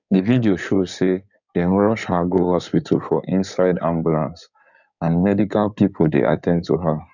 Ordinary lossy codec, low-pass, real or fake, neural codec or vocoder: none; 7.2 kHz; fake; codec, 16 kHz in and 24 kHz out, 2.2 kbps, FireRedTTS-2 codec